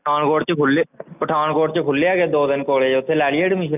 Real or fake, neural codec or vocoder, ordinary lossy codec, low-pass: real; none; none; 3.6 kHz